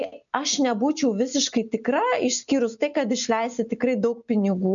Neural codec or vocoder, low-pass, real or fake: none; 7.2 kHz; real